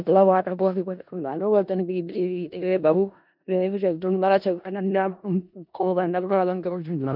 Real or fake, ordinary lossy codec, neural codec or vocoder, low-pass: fake; none; codec, 16 kHz in and 24 kHz out, 0.4 kbps, LongCat-Audio-Codec, four codebook decoder; 5.4 kHz